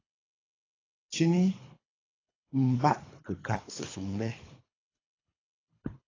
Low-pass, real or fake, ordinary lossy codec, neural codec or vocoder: 7.2 kHz; fake; AAC, 32 kbps; codec, 24 kHz, 3 kbps, HILCodec